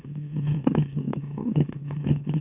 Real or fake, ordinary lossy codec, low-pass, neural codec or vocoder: fake; none; 3.6 kHz; autoencoder, 44.1 kHz, a latent of 192 numbers a frame, MeloTTS